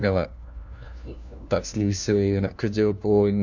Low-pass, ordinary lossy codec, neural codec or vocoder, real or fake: 7.2 kHz; none; codec, 16 kHz, 1 kbps, FunCodec, trained on LibriTTS, 50 frames a second; fake